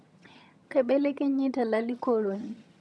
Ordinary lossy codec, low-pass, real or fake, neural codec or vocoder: none; none; fake; vocoder, 22.05 kHz, 80 mel bands, HiFi-GAN